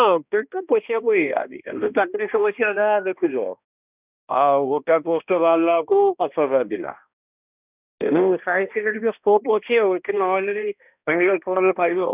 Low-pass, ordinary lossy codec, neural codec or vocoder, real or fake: 3.6 kHz; none; codec, 16 kHz, 1 kbps, X-Codec, HuBERT features, trained on balanced general audio; fake